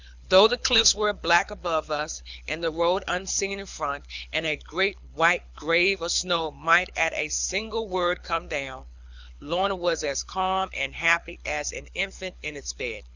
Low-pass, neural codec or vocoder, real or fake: 7.2 kHz; codec, 24 kHz, 6 kbps, HILCodec; fake